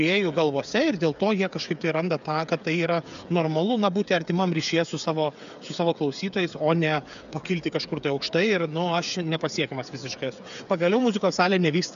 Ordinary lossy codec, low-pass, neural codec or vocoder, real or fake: AAC, 96 kbps; 7.2 kHz; codec, 16 kHz, 8 kbps, FreqCodec, smaller model; fake